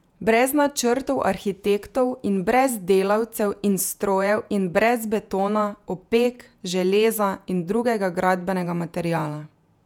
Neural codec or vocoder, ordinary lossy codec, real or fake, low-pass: vocoder, 48 kHz, 128 mel bands, Vocos; none; fake; 19.8 kHz